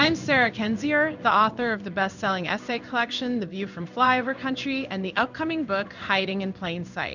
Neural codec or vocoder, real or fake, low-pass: codec, 16 kHz in and 24 kHz out, 1 kbps, XY-Tokenizer; fake; 7.2 kHz